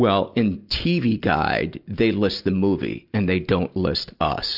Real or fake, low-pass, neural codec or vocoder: real; 5.4 kHz; none